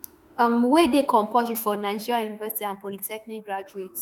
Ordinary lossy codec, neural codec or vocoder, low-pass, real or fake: none; autoencoder, 48 kHz, 32 numbers a frame, DAC-VAE, trained on Japanese speech; none; fake